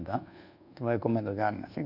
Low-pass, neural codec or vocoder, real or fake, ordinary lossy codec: 5.4 kHz; codec, 24 kHz, 1.2 kbps, DualCodec; fake; none